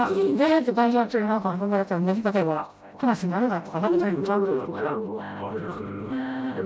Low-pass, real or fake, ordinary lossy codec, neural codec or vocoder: none; fake; none; codec, 16 kHz, 0.5 kbps, FreqCodec, smaller model